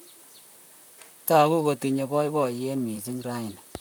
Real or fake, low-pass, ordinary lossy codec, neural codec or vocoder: fake; none; none; vocoder, 44.1 kHz, 128 mel bands, Pupu-Vocoder